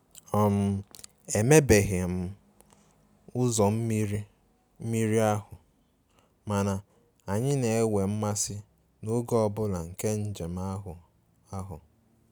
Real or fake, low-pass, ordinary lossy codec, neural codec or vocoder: real; none; none; none